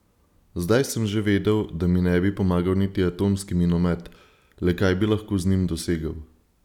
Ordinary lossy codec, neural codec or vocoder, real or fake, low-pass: none; none; real; 19.8 kHz